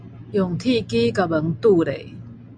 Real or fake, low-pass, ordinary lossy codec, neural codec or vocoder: real; 9.9 kHz; Opus, 64 kbps; none